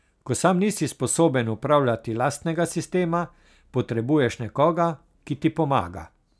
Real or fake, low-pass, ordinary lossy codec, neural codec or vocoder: real; none; none; none